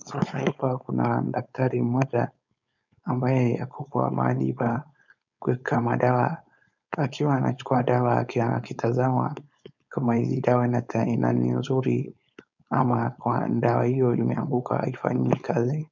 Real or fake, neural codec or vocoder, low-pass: fake; codec, 16 kHz, 4.8 kbps, FACodec; 7.2 kHz